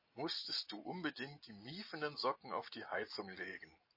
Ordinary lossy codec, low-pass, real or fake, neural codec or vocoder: MP3, 24 kbps; 5.4 kHz; real; none